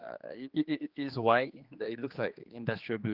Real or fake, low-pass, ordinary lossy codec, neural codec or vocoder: fake; 5.4 kHz; Opus, 32 kbps; codec, 16 kHz, 2 kbps, X-Codec, HuBERT features, trained on general audio